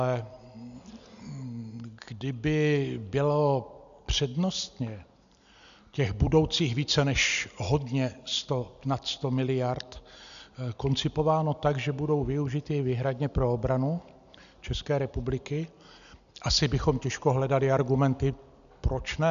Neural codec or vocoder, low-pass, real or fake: none; 7.2 kHz; real